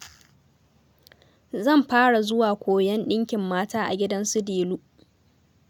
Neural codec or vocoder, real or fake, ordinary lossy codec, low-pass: none; real; none; 19.8 kHz